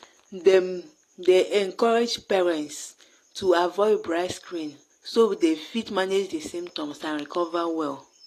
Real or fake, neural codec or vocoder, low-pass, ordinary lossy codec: real; none; 14.4 kHz; AAC, 48 kbps